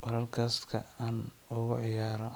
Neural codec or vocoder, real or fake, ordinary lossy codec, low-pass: vocoder, 44.1 kHz, 128 mel bands every 512 samples, BigVGAN v2; fake; none; none